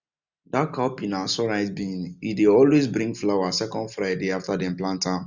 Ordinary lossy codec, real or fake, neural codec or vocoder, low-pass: none; real; none; 7.2 kHz